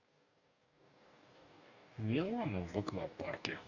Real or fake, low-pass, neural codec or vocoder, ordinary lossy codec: fake; 7.2 kHz; codec, 44.1 kHz, 2.6 kbps, DAC; none